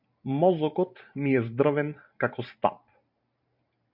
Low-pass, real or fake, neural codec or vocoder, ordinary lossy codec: 5.4 kHz; fake; vocoder, 44.1 kHz, 128 mel bands every 512 samples, BigVGAN v2; MP3, 48 kbps